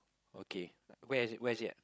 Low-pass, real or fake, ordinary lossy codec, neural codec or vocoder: none; fake; none; codec, 16 kHz, 16 kbps, FreqCodec, larger model